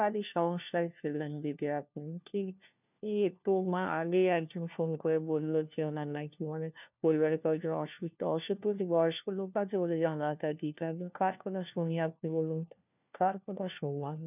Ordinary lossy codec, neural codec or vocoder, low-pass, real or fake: none; codec, 16 kHz, 1 kbps, FunCodec, trained on LibriTTS, 50 frames a second; 3.6 kHz; fake